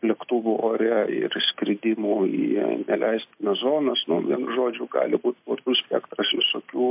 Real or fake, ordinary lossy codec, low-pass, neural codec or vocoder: real; MP3, 32 kbps; 3.6 kHz; none